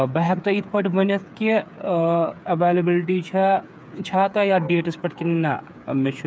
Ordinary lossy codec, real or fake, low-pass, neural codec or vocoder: none; fake; none; codec, 16 kHz, 8 kbps, FreqCodec, smaller model